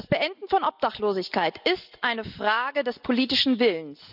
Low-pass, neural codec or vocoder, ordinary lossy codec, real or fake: 5.4 kHz; none; none; real